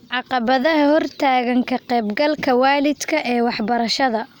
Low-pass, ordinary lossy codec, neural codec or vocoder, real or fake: 19.8 kHz; none; none; real